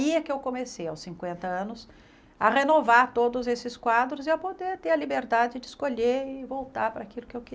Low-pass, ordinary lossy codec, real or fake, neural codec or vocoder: none; none; real; none